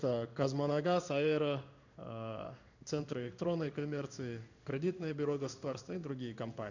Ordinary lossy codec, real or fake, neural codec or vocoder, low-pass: none; fake; codec, 16 kHz in and 24 kHz out, 1 kbps, XY-Tokenizer; 7.2 kHz